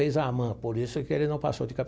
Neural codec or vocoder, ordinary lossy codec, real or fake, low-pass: none; none; real; none